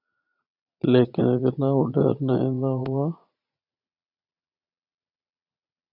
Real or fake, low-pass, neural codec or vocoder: fake; 5.4 kHz; vocoder, 22.05 kHz, 80 mel bands, Vocos